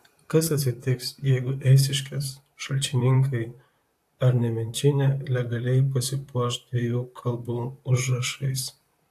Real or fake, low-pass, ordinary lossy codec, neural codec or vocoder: fake; 14.4 kHz; AAC, 64 kbps; vocoder, 44.1 kHz, 128 mel bands, Pupu-Vocoder